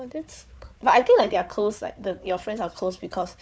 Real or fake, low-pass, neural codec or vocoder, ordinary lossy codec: fake; none; codec, 16 kHz, 4 kbps, FunCodec, trained on Chinese and English, 50 frames a second; none